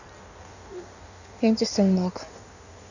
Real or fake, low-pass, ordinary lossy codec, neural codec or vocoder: fake; 7.2 kHz; none; codec, 16 kHz in and 24 kHz out, 1.1 kbps, FireRedTTS-2 codec